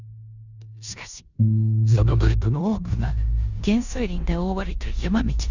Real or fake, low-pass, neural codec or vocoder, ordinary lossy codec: fake; 7.2 kHz; codec, 16 kHz in and 24 kHz out, 0.9 kbps, LongCat-Audio-Codec, four codebook decoder; none